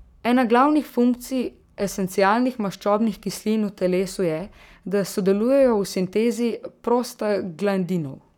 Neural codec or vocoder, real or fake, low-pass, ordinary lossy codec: codec, 44.1 kHz, 7.8 kbps, Pupu-Codec; fake; 19.8 kHz; none